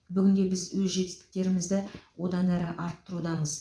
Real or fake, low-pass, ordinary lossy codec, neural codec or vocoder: fake; none; none; vocoder, 22.05 kHz, 80 mel bands, WaveNeXt